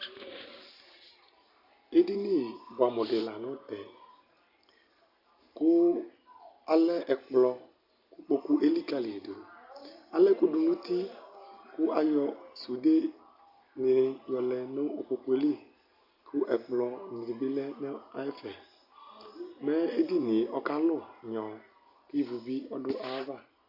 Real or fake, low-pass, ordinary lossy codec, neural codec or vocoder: real; 5.4 kHz; Opus, 64 kbps; none